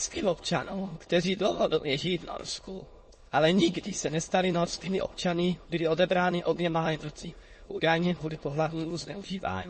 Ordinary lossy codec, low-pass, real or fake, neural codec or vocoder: MP3, 32 kbps; 9.9 kHz; fake; autoencoder, 22.05 kHz, a latent of 192 numbers a frame, VITS, trained on many speakers